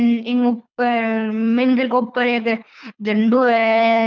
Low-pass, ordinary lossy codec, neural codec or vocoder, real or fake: 7.2 kHz; none; codec, 24 kHz, 3 kbps, HILCodec; fake